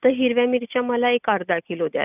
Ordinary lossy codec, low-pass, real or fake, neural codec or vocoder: none; 3.6 kHz; real; none